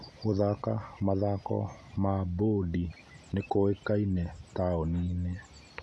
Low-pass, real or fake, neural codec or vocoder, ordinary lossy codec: none; real; none; none